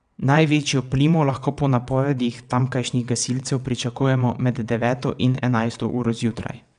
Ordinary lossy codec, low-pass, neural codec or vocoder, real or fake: none; 9.9 kHz; vocoder, 22.05 kHz, 80 mel bands, WaveNeXt; fake